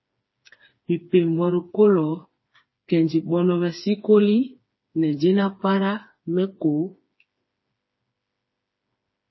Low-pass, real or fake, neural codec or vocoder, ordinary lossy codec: 7.2 kHz; fake; codec, 16 kHz, 4 kbps, FreqCodec, smaller model; MP3, 24 kbps